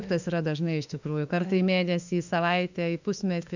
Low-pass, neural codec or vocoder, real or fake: 7.2 kHz; autoencoder, 48 kHz, 32 numbers a frame, DAC-VAE, trained on Japanese speech; fake